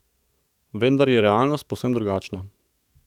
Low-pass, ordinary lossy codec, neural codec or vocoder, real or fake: 19.8 kHz; none; codec, 44.1 kHz, 7.8 kbps, DAC; fake